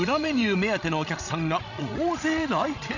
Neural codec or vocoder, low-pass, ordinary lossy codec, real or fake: codec, 16 kHz, 16 kbps, FreqCodec, larger model; 7.2 kHz; none; fake